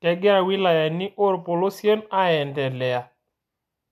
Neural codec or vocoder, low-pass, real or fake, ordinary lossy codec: none; 14.4 kHz; real; AAC, 96 kbps